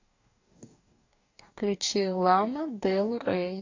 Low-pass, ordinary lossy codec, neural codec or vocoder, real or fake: 7.2 kHz; AAC, 48 kbps; codec, 44.1 kHz, 2.6 kbps, DAC; fake